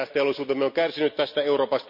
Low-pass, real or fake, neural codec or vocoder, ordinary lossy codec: 5.4 kHz; real; none; none